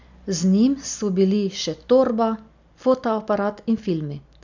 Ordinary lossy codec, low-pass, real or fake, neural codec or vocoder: none; 7.2 kHz; real; none